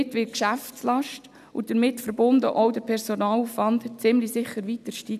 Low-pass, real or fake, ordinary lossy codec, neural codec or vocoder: 14.4 kHz; real; none; none